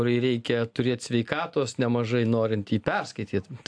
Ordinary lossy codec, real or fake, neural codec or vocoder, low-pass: AAC, 64 kbps; real; none; 9.9 kHz